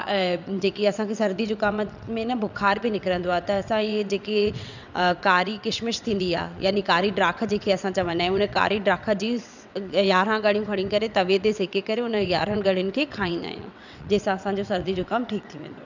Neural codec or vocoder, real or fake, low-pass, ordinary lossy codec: vocoder, 22.05 kHz, 80 mel bands, WaveNeXt; fake; 7.2 kHz; none